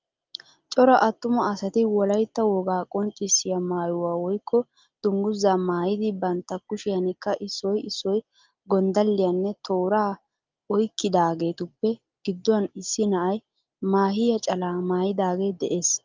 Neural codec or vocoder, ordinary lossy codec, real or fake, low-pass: none; Opus, 32 kbps; real; 7.2 kHz